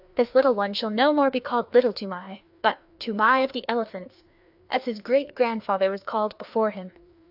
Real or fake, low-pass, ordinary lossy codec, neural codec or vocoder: fake; 5.4 kHz; AAC, 48 kbps; codec, 16 kHz, 2 kbps, FreqCodec, larger model